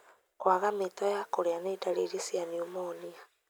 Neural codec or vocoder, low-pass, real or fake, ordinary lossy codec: none; none; real; none